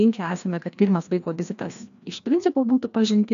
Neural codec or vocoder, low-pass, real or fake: codec, 16 kHz, 1 kbps, FreqCodec, larger model; 7.2 kHz; fake